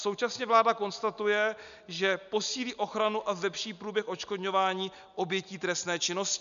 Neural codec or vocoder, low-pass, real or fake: none; 7.2 kHz; real